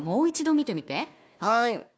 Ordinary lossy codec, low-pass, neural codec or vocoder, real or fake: none; none; codec, 16 kHz, 1 kbps, FunCodec, trained on Chinese and English, 50 frames a second; fake